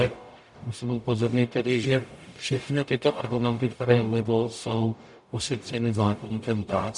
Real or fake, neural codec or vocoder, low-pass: fake; codec, 44.1 kHz, 0.9 kbps, DAC; 10.8 kHz